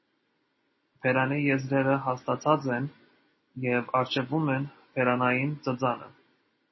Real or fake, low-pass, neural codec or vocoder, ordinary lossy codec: real; 7.2 kHz; none; MP3, 24 kbps